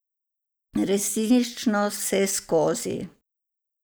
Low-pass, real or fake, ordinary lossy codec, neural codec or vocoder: none; real; none; none